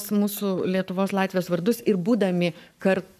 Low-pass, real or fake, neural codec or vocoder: 14.4 kHz; fake; codec, 44.1 kHz, 7.8 kbps, Pupu-Codec